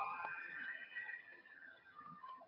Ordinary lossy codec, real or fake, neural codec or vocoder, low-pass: AAC, 48 kbps; fake; vocoder, 22.05 kHz, 80 mel bands, HiFi-GAN; 5.4 kHz